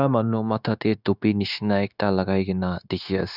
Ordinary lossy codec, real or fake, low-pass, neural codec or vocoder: none; fake; 5.4 kHz; codec, 16 kHz, 0.9 kbps, LongCat-Audio-Codec